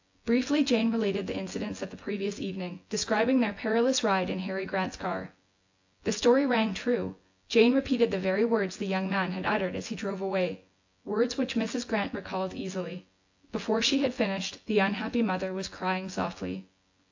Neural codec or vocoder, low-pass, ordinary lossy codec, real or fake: vocoder, 24 kHz, 100 mel bands, Vocos; 7.2 kHz; AAC, 48 kbps; fake